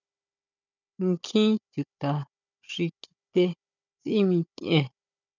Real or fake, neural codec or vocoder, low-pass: fake; codec, 16 kHz, 16 kbps, FunCodec, trained on Chinese and English, 50 frames a second; 7.2 kHz